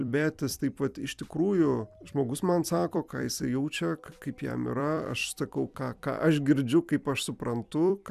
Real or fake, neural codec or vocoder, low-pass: fake; vocoder, 44.1 kHz, 128 mel bands every 256 samples, BigVGAN v2; 14.4 kHz